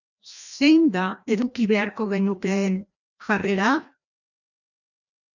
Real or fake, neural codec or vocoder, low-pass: fake; codec, 16 kHz, 1 kbps, FreqCodec, larger model; 7.2 kHz